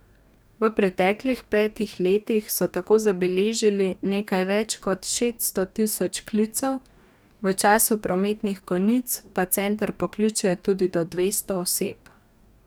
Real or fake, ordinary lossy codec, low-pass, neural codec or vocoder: fake; none; none; codec, 44.1 kHz, 2.6 kbps, DAC